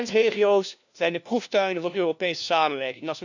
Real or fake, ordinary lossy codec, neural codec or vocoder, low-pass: fake; none; codec, 16 kHz, 1 kbps, FunCodec, trained on LibriTTS, 50 frames a second; 7.2 kHz